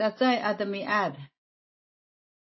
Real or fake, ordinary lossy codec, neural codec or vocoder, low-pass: real; MP3, 24 kbps; none; 7.2 kHz